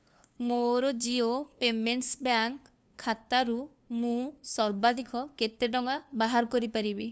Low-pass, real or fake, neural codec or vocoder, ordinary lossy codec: none; fake; codec, 16 kHz, 2 kbps, FunCodec, trained on LibriTTS, 25 frames a second; none